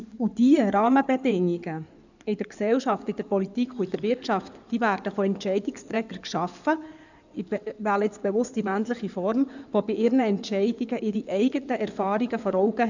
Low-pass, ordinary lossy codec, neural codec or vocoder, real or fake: 7.2 kHz; none; codec, 16 kHz in and 24 kHz out, 2.2 kbps, FireRedTTS-2 codec; fake